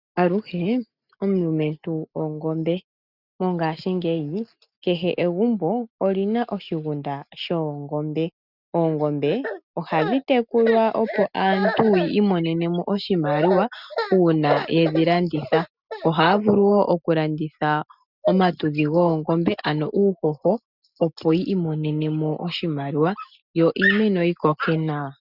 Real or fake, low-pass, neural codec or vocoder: real; 5.4 kHz; none